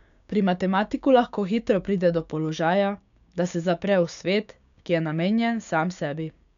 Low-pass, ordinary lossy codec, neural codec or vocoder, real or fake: 7.2 kHz; none; codec, 16 kHz, 6 kbps, DAC; fake